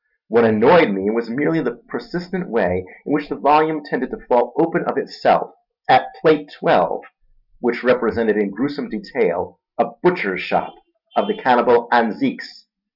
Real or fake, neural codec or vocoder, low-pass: real; none; 5.4 kHz